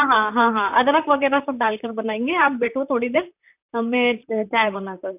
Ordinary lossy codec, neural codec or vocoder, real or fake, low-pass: none; vocoder, 44.1 kHz, 128 mel bands, Pupu-Vocoder; fake; 3.6 kHz